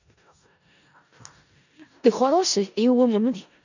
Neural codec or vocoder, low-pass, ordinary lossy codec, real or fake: codec, 16 kHz in and 24 kHz out, 0.4 kbps, LongCat-Audio-Codec, four codebook decoder; 7.2 kHz; none; fake